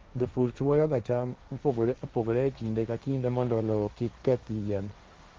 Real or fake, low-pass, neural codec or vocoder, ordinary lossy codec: fake; 7.2 kHz; codec, 16 kHz, 1.1 kbps, Voila-Tokenizer; Opus, 32 kbps